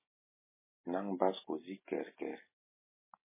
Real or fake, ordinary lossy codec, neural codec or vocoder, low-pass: real; MP3, 16 kbps; none; 3.6 kHz